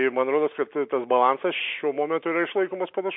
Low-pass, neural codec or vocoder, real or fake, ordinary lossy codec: 5.4 kHz; none; real; MP3, 32 kbps